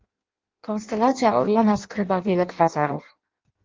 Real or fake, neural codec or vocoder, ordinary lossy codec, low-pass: fake; codec, 16 kHz in and 24 kHz out, 0.6 kbps, FireRedTTS-2 codec; Opus, 32 kbps; 7.2 kHz